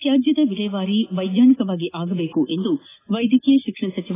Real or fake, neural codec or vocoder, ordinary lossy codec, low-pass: real; none; AAC, 16 kbps; 3.6 kHz